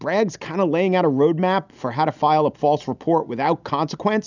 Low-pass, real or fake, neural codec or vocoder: 7.2 kHz; real; none